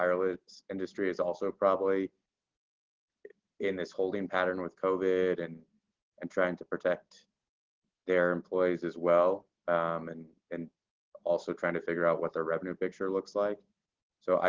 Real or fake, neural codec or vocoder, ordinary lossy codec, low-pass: real; none; Opus, 16 kbps; 7.2 kHz